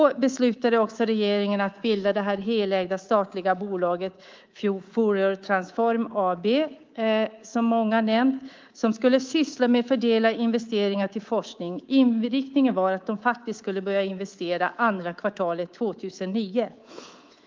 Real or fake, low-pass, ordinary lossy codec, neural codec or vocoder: fake; 7.2 kHz; Opus, 32 kbps; codec, 24 kHz, 3.1 kbps, DualCodec